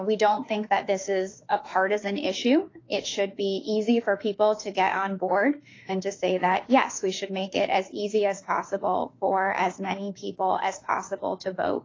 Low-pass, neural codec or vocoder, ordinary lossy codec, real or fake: 7.2 kHz; autoencoder, 48 kHz, 32 numbers a frame, DAC-VAE, trained on Japanese speech; AAC, 32 kbps; fake